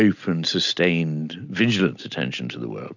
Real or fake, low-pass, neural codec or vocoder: real; 7.2 kHz; none